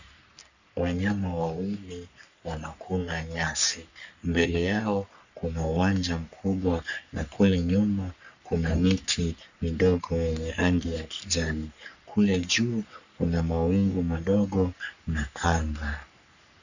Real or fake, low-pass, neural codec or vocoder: fake; 7.2 kHz; codec, 44.1 kHz, 3.4 kbps, Pupu-Codec